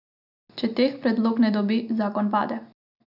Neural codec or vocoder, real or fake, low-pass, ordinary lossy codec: none; real; 5.4 kHz; none